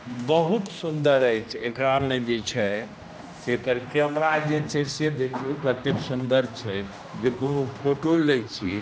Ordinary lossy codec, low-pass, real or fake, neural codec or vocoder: none; none; fake; codec, 16 kHz, 1 kbps, X-Codec, HuBERT features, trained on general audio